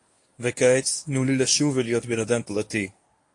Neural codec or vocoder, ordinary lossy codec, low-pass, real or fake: codec, 24 kHz, 0.9 kbps, WavTokenizer, medium speech release version 1; AAC, 48 kbps; 10.8 kHz; fake